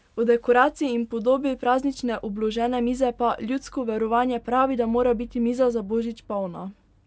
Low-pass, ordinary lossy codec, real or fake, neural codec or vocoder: none; none; real; none